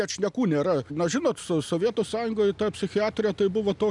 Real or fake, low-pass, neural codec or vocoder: real; 10.8 kHz; none